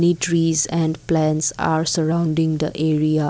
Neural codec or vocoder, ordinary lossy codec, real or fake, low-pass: none; none; real; none